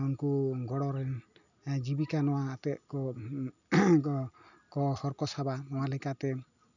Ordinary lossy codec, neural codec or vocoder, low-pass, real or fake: none; none; 7.2 kHz; real